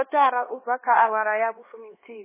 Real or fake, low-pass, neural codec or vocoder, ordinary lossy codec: fake; 3.6 kHz; codec, 16 kHz, 2 kbps, X-Codec, HuBERT features, trained on LibriSpeech; MP3, 16 kbps